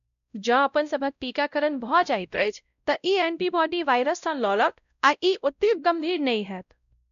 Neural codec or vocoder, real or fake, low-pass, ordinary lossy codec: codec, 16 kHz, 0.5 kbps, X-Codec, WavLM features, trained on Multilingual LibriSpeech; fake; 7.2 kHz; none